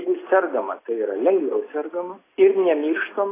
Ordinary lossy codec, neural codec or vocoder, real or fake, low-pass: AAC, 16 kbps; none; real; 3.6 kHz